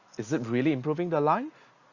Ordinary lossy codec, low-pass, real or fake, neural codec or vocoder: Opus, 64 kbps; 7.2 kHz; real; none